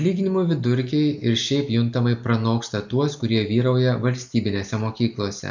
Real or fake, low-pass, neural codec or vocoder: real; 7.2 kHz; none